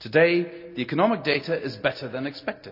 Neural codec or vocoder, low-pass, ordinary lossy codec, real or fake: none; 5.4 kHz; none; real